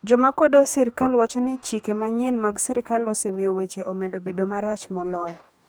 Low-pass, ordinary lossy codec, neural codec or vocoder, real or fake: none; none; codec, 44.1 kHz, 2.6 kbps, DAC; fake